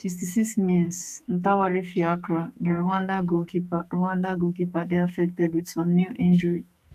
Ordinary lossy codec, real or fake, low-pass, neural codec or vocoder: none; fake; 14.4 kHz; codec, 44.1 kHz, 2.6 kbps, SNAC